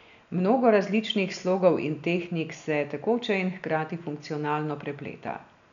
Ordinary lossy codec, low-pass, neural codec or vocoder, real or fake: none; 7.2 kHz; none; real